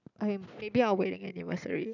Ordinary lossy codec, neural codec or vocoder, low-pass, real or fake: none; none; 7.2 kHz; real